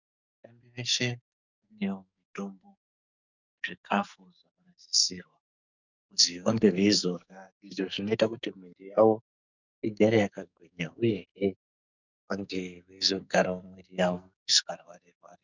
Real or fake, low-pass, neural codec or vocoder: fake; 7.2 kHz; codec, 44.1 kHz, 2.6 kbps, SNAC